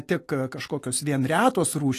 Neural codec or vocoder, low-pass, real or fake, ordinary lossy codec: none; 14.4 kHz; real; AAC, 48 kbps